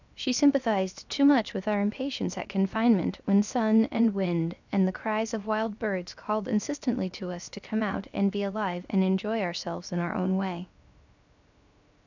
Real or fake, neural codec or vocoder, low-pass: fake; codec, 16 kHz, 0.7 kbps, FocalCodec; 7.2 kHz